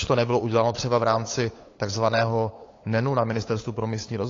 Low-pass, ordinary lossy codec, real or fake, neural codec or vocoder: 7.2 kHz; AAC, 32 kbps; fake; codec, 16 kHz, 8 kbps, FunCodec, trained on LibriTTS, 25 frames a second